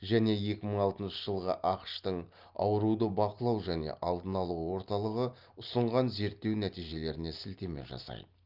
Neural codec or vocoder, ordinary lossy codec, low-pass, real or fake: none; Opus, 24 kbps; 5.4 kHz; real